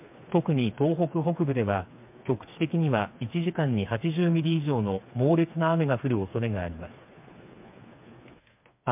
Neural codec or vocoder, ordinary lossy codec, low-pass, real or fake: codec, 16 kHz, 4 kbps, FreqCodec, smaller model; MP3, 32 kbps; 3.6 kHz; fake